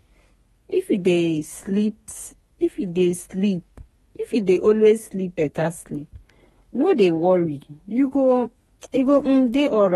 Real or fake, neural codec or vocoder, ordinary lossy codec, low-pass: fake; codec, 32 kHz, 1.9 kbps, SNAC; AAC, 32 kbps; 14.4 kHz